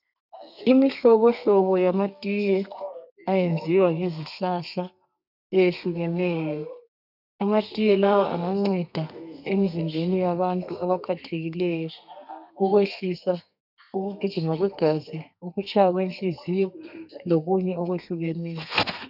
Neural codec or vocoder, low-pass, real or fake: codec, 32 kHz, 1.9 kbps, SNAC; 5.4 kHz; fake